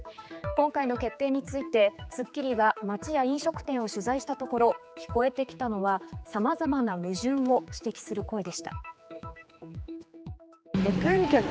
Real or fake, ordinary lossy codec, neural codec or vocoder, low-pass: fake; none; codec, 16 kHz, 4 kbps, X-Codec, HuBERT features, trained on general audio; none